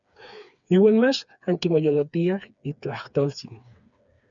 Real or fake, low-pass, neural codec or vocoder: fake; 7.2 kHz; codec, 16 kHz, 4 kbps, FreqCodec, smaller model